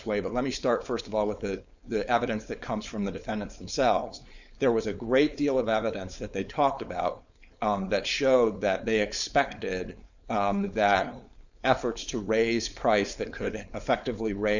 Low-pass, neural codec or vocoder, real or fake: 7.2 kHz; codec, 16 kHz, 4.8 kbps, FACodec; fake